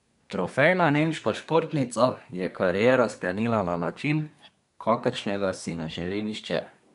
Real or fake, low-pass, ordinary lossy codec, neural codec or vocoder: fake; 10.8 kHz; none; codec, 24 kHz, 1 kbps, SNAC